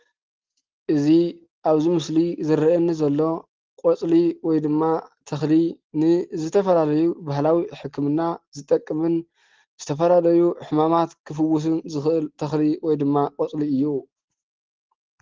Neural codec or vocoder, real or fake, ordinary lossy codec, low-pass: none; real; Opus, 16 kbps; 7.2 kHz